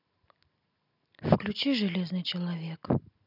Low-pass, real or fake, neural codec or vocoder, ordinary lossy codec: 5.4 kHz; real; none; none